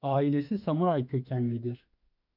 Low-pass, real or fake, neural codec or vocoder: 5.4 kHz; fake; autoencoder, 48 kHz, 32 numbers a frame, DAC-VAE, trained on Japanese speech